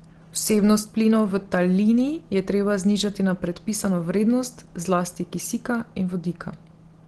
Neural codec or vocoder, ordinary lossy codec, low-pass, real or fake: none; Opus, 16 kbps; 10.8 kHz; real